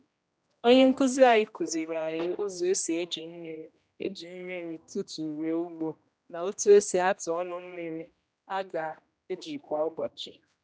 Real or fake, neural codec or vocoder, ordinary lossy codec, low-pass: fake; codec, 16 kHz, 1 kbps, X-Codec, HuBERT features, trained on general audio; none; none